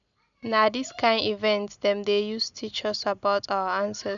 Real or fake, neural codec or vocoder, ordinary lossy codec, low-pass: real; none; none; 7.2 kHz